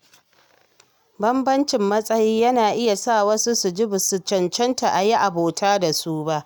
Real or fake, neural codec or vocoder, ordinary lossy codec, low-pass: real; none; none; none